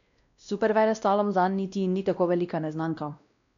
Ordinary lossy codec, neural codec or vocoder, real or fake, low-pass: none; codec, 16 kHz, 1 kbps, X-Codec, WavLM features, trained on Multilingual LibriSpeech; fake; 7.2 kHz